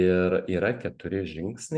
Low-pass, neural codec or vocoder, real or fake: 9.9 kHz; none; real